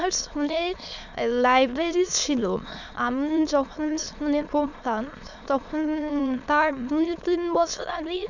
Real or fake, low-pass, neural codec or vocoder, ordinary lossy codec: fake; 7.2 kHz; autoencoder, 22.05 kHz, a latent of 192 numbers a frame, VITS, trained on many speakers; none